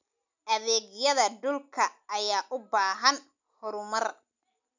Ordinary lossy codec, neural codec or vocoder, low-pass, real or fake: none; none; 7.2 kHz; real